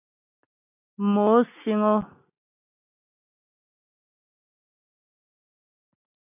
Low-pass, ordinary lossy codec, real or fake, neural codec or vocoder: 3.6 kHz; MP3, 32 kbps; real; none